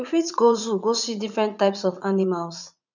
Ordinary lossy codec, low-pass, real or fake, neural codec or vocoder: none; 7.2 kHz; fake; vocoder, 44.1 kHz, 80 mel bands, Vocos